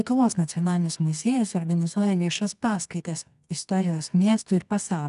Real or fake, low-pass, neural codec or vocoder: fake; 10.8 kHz; codec, 24 kHz, 0.9 kbps, WavTokenizer, medium music audio release